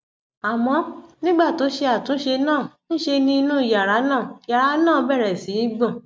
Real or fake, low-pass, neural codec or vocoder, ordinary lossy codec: real; none; none; none